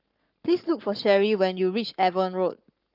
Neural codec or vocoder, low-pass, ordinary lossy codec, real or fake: codec, 44.1 kHz, 7.8 kbps, Pupu-Codec; 5.4 kHz; Opus, 32 kbps; fake